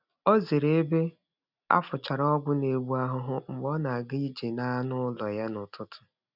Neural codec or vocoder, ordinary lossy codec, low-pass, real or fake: none; none; 5.4 kHz; real